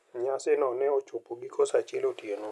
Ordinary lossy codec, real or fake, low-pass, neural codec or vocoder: none; real; none; none